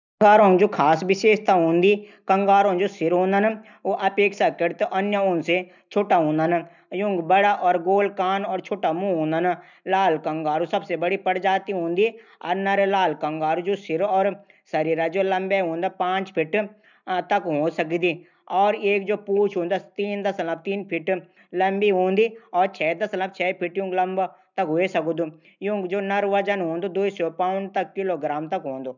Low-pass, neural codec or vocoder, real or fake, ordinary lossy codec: 7.2 kHz; none; real; none